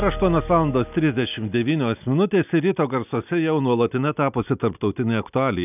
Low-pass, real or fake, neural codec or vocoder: 3.6 kHz; real; none